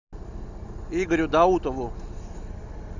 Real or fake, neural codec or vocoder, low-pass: real; none; 7.2 kHz